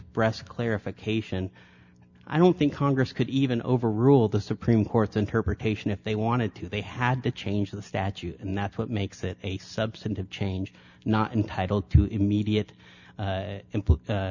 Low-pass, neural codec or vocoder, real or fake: 7.2 kHz; none; real